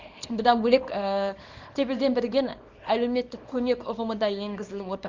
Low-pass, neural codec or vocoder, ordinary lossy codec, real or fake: 7.2 kHz; codec, 24 kHz, 0.9 kbps, WavTokenizer, small release; Opus, 32 kbps; fake